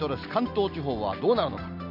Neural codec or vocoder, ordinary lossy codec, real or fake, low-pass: none; none; real; 5.4 kHz